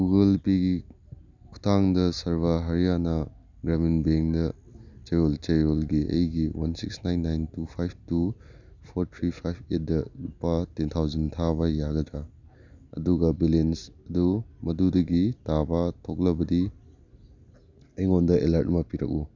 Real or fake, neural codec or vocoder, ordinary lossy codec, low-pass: real; none; none; 7.2 kHz